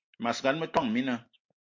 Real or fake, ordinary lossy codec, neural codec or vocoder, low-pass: real; MP3, 48 kbps; none; 7.2 kHz